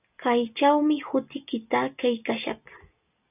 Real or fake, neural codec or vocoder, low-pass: real; none; 3.6 kHz